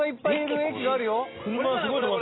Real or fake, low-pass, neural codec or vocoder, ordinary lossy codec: real; 7.2 kHz; none; AAC, 16 kbps